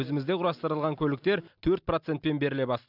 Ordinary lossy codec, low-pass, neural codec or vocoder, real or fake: none; 5.4 kHz; none; real